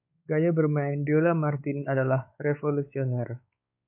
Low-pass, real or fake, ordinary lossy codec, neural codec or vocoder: 3.6 kHz; fake; MP3, 32 kbps; codec, 16 kHz, 4 kbps, X-Codec, WavLM features, trained on Multilingual LibriSpeech